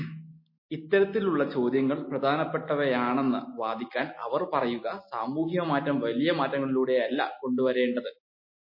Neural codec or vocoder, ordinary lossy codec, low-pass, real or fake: none; MP3, 24 kbps; 5.4 kHz; real